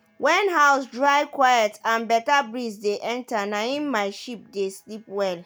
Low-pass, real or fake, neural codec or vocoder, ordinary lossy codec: none; real; none; none